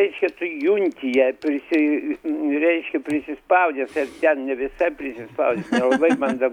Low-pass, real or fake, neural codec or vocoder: 19.8 kHz; real; none